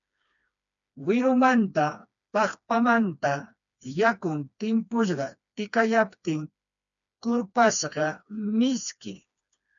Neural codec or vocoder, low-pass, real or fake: codec, 16 kHz, 2 kbps, FreqCodec, smaller model; 7.2 kHz; fake